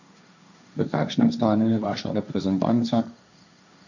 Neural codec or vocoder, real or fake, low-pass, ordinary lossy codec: codec, 16 kHz, 1.1 kbps, Voila-Tokenizer; fake; 7.2 kHz; none